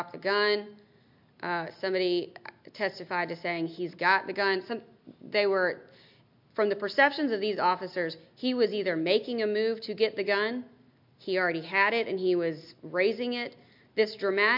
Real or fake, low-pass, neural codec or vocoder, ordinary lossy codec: real; 5.4 kHz; none; MP3, 48 kbps